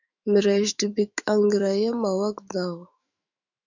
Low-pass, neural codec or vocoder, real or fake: 7.2 kHz; autoencoder, 48 kHz, 128 numbers a frame, DAC-VAE, trained on Japanese speech; fake